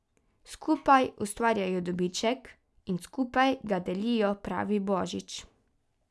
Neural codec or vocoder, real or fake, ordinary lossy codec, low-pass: none; real; none; none